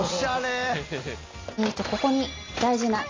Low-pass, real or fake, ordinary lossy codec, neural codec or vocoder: 7.2 kHz; real; AAC, 32 kbps; none